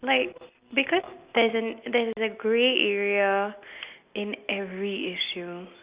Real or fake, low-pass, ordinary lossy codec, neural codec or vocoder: real; 3.6 kHz; Opus, 64 kbps; none